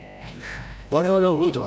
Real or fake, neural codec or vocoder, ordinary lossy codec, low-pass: fake; codec, 16 kHz, 0.5 kbps, FreqCodec, larger model; none; none